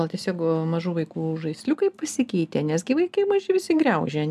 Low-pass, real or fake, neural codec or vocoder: 14.4 kHz; real; none